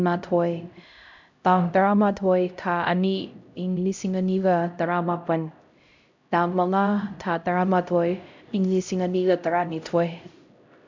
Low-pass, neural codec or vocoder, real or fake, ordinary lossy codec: 7.2 kHz; codec, 16 kHz, 0.5 kbps, X-Codec, HuBERT features, trained on LibriSpeech; fake; MP3, 64 kbps